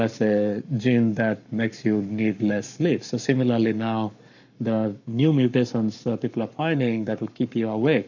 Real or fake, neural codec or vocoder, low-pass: fake; codec, 44.1 kHz, 7.8 kbps, Pupu-Codec; 7.2 kHz